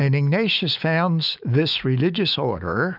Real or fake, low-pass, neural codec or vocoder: fake; 5.4 kHz; autoencoder, 48 kHz, 128 numbers a frame, DAC-VAE, trained on Japanese speech